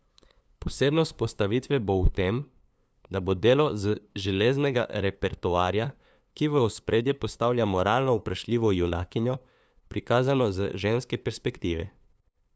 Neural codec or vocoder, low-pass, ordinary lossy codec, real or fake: codec, 16 kHz, 2 kbps, FunCodec, trained on LibriTTS, 25 frames a second; none; none; fake